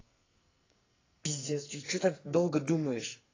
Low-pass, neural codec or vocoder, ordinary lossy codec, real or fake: 7.2 kHz; codec, 44.1 kHz, 2.6 kbps, SNAC; AAC, 32 kbps; fake